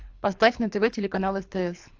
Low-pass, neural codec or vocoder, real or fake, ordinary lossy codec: 7.2 kHz; codec, 24 kHz, 3 kbps, HILCodec; fake; AAC, 48 kbps